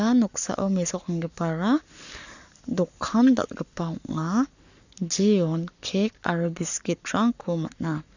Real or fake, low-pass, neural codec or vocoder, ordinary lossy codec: fake; 7.2 kHz; codec, 44.1 kHz, 7.8 kbps, Pupu-Codec; none